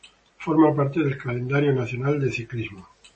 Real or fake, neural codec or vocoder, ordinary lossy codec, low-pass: real; none; MP3, 32 kbps; 10.8 kHz